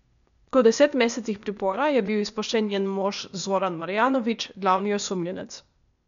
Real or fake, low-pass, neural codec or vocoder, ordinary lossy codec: fake; 7.2 kHz; codec, 16 kHz, 0.8 kbps, ZipCodec; none